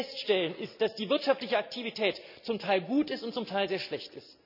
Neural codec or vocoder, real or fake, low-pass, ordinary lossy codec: none; real; 5.4 kHz; none